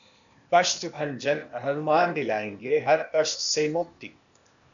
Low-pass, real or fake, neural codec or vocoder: 7.2 kHz; fake; codec, 16 kHz, 0.8 kbps, ZipCodec